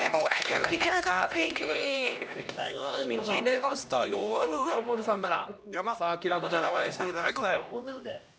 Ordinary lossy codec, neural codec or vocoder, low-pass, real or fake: none; codec, 16 kHz, 1 kbps, X-Codec, HuBERT features, trained on LibriSpeech; none; fake